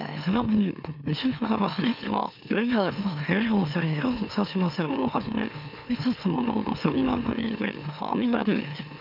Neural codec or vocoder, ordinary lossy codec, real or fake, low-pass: autoencoder, 44.1 kHz, a latent of 192 numbers a frame, MeloTTS; none; fake; 5.4 kHz